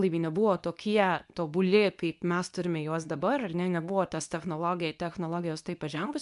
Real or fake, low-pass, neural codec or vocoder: fake; 10.8 kHz; codec, 24 kHz, 0.9 kbps, WavTokenizer, medium speech release version 2